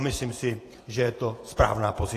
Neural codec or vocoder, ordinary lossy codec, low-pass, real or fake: vocoder, 48 kHz, 128 mel bands, Vocos; AAC, 48 kbps; 14.4 kHz; fake